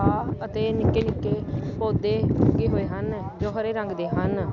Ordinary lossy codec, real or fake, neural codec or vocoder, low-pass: none; real; none; 7.2 kHz